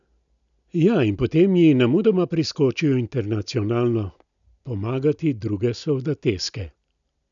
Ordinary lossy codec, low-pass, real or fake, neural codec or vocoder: none; 7.2 kHz; real; none